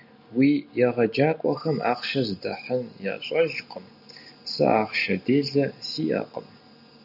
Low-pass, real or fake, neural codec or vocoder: 5.4 kHz; real; none